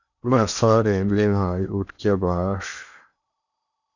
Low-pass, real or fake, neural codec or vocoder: 7.2 kHz; fake; codec, 16 kHz in and 24 kHz out, 0.8 kbps, FocalCodec, streaming, 65536 codes